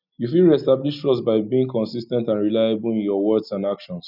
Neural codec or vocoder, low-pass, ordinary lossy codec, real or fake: none; 5.4 kHz; none; real